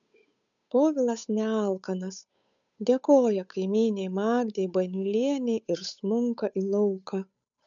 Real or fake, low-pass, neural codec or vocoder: fake; 7.2 kHz; codec, 16 kHz, 8 kbps, FunCodec, trained on Chinese and English, 25 frames a second